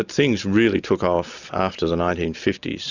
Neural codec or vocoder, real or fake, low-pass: none; real; 7.2 kHz